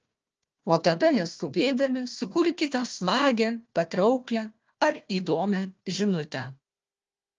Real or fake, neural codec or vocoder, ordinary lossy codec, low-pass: fake; codec, 16 kHz, 1 kbps, FunCodec, trained on Chinese and English, 50 frames a second; Opus, 24 kbps; 7.2 kHz